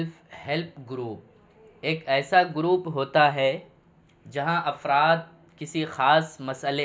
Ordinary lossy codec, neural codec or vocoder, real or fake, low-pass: none; none; real; none